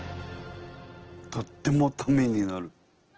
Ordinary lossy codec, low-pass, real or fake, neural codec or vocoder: Opus, 16 kbps; 7.2 kHz; real; none